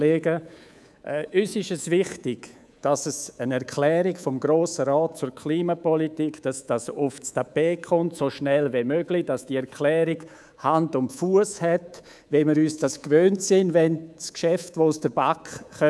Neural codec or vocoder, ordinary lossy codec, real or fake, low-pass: codec, 24 kHz, 3.1 kbps, DualCodec; none; fake; none